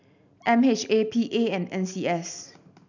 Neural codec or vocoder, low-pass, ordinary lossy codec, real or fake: none; 7.2 kHz; none; real